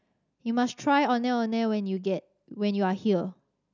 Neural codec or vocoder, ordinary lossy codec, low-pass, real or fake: none; none; 7.2 kHz; real